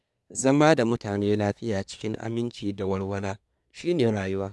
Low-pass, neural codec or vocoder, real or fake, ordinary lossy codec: none; codec, 24 kHz, 1 kbps, SNAC; fake; none